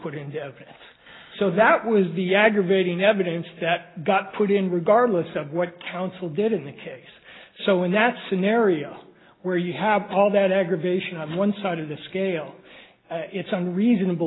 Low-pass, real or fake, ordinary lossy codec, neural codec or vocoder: 7.2 kHz; real; AAC, 16 kbps; none